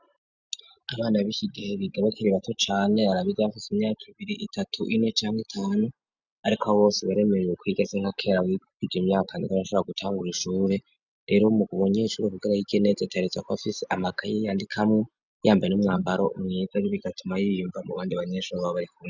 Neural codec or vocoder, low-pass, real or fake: none; 7.2 kHz; real